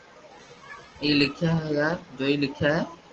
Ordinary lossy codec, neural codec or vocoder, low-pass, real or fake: Opus, 16 kbps; none; 7.2 kHz; real